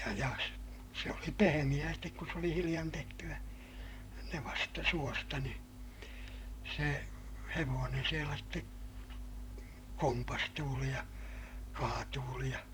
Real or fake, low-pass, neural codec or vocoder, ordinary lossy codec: fake; none; vocoder, 44.1 kHz, 128 mel bands, Pupu-Vocoder; none